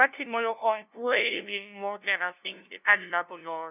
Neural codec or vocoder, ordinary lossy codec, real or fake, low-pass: codec, 16 kHz, 0.5 kbps, FunCodec, trained on LibriTTS, 25 frames a second; none; fake; 3.6 kHz